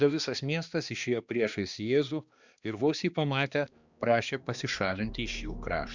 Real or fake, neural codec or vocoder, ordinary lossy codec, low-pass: fake; codec, 16 kHz, 2 kbps, X-Codec, HuBERT features, trained on balanced general audio; Opus, 64 kbps; 7.2 kHz